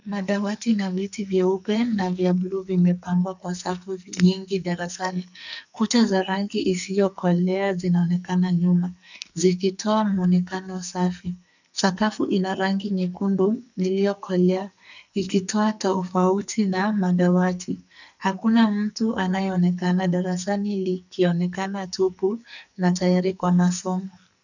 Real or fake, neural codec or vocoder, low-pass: fake; codec, 44.1 kHz, 2.6 kbps, SNAC; 7.2 kHz